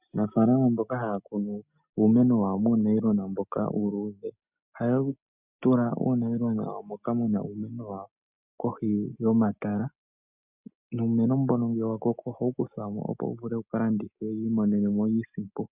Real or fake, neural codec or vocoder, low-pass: real; none; 3.6 kHz